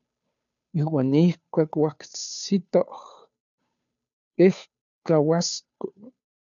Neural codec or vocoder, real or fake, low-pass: codec, 16 kHz, 2 kbps, FunCodec, trained on Chinese and English, 25 frames a second; fake; 7.2 kHz